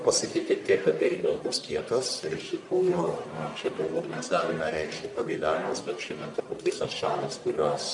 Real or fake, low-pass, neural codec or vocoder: fake; 10.8 kHz; codec, 44.1 kHz, 1.7 kbps, Pupu-Codec